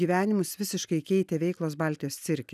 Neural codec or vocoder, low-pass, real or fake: none; 14.4 kHz; real